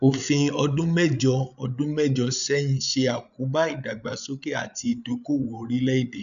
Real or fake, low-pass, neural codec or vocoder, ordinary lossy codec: fake; 7.2 kHz; codec, 16 kHz, 8 kbps, FreqCodec, larger model; none